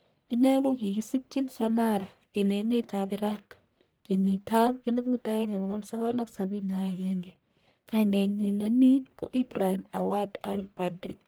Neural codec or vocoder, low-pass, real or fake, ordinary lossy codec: codec, 44.1 kHz, 1.7 kbps, Pupu-Codec; none; fake; none